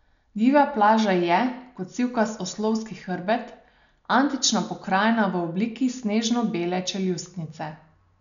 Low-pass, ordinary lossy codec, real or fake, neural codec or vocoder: 7.2 kHz; none; real; none